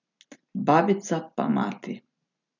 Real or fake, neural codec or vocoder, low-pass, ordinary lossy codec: real; none; 7.2 kHz; none